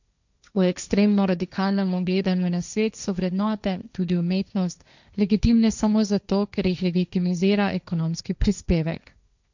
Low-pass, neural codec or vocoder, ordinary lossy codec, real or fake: 7.2 kHz; codec, 16 kHz, 1.1 kbps, Voila-Tokenizer; none; fake